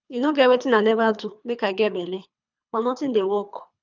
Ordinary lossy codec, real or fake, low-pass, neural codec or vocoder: none; fake; 7.2 kHz; codec, 24 kHz, 3 kbps, HILCodec